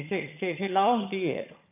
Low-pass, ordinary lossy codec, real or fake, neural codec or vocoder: 3.6 kHz; none; fake; vocoder, 22.05 kHz, 80 mel bands, HiFi-GAN